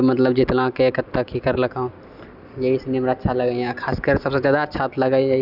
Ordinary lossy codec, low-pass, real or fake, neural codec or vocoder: none; 5.4 kHz; real; none